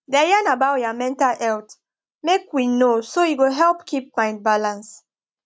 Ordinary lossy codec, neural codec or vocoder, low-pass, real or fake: none; none; none; real